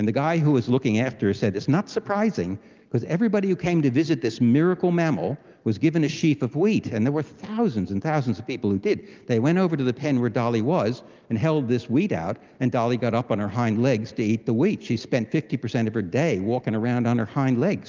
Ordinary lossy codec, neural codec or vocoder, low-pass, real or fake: Opus, 24 kbps; none; 7.2 kHz; real